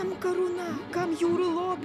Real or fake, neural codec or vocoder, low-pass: real; none; 14.4 kHz